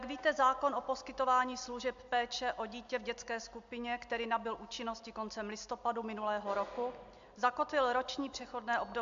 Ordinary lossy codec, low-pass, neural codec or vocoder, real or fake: AAC, 64 kbps; 7.2 kHz; none; real